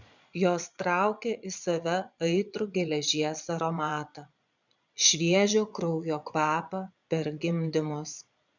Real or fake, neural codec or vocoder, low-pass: fake; vocoder, 22.05 kHz, 80 mel bands, Vocos; 7.2 kHz